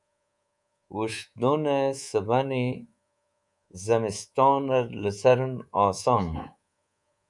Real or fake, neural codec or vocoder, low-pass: fake; codec, 24 kHz, 3.1 kbps, DualCodec; 10.8 kHz